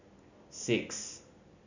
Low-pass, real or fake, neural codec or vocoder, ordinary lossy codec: 7.2 kHz; real; none; none